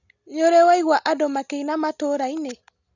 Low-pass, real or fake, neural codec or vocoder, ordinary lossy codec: 7.2 kHz; real; none; none